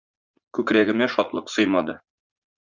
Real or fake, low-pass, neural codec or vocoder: fake; 7.2 kHz; vocoder, 44.1 kHz, 80 mel bands, Vocos